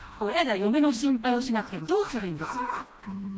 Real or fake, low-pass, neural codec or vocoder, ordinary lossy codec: fake; none; codec, 16 kHz, 1 kbps, FreqCodec, smaller model; none